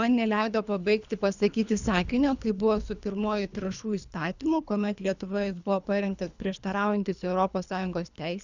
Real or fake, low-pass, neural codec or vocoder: fake; 7.2 kHz; codec, 24 kHz, 3 kbps, HILCodec